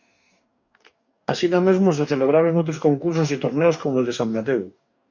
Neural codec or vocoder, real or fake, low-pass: codec, 44.1 kHz, 2.6 kbps, DAC; fake; 7.2 kHz